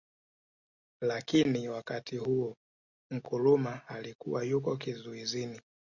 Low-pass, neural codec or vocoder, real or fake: 7.2 kHz; none; real